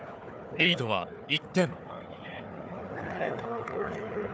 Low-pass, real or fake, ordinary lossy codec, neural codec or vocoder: none; fake; none; codec, 16 kHz, 4 kbps, FunCodec, trained on Chinese and English, 50 frames a second